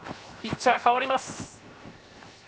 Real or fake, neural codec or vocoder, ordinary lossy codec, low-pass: fake; codec, 16 kHz, 0.7 kbps, FocalCodec; none; none